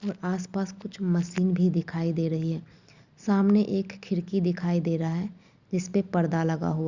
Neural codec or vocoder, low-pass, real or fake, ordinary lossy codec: none; 7.2 kHz; real; Opus, 64 kbps